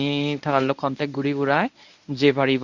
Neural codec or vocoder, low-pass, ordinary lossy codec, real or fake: codec, 24 kHz, 0.9 kbps, WavTokenizer, medium speech release version 1; 7.2 kHz; none; fake